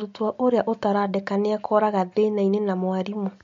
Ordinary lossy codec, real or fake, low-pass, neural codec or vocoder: MP3, 48 kbps; real; 7.2 kHz; none